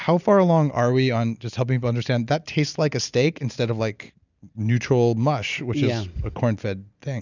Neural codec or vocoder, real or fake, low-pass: none; real; 7.2 kHz